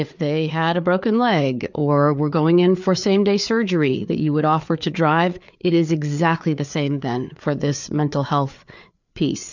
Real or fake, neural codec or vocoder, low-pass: fake; codec, 16 kHz, 4 kbps, FreqCodec, larger model; 7.2 kHz